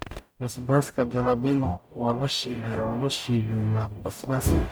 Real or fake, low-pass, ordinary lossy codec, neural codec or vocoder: fake; none; none; codec, 44.1 kHz, 0.9 kbps, DAC